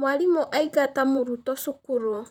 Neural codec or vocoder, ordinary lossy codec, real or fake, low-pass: vocoder, 44.1 kHz, 128 mel bands, Pupu-Vocoder; none; fake; 19.8 kHz